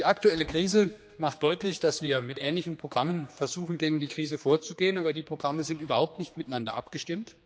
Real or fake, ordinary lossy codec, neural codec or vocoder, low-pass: fake; none; codec, 16 kHz, 2 kbps, X-Codec, HuBERT features, trained on general audio; none